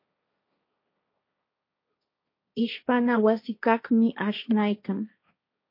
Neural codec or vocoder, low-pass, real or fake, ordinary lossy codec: codec, 16 kHz, 1.1 kbps, Voila-Tokenizer; 5.4 kHz; fake; MP3, 32 kbps